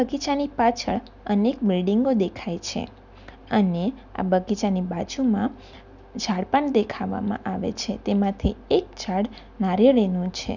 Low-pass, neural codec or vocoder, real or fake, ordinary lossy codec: 7.2 kHz; none; real; none